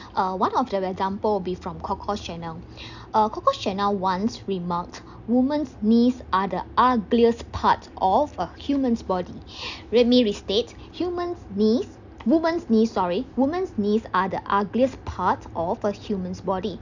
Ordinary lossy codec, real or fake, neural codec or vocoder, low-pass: none; real; none; 7.2 kHz